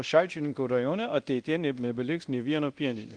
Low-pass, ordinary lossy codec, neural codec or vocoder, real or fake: 9.9 kHz; Opus, 24 kbps; codec, 24 kHz, 0.9 kbps, DualCodec; fake